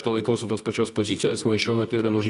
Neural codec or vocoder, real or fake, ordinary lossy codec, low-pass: codec, 24 kHz, 0.9 kbps, WavTokenizer, medium music audio release; fake; Opus, 64 kbps; 10.8 kHz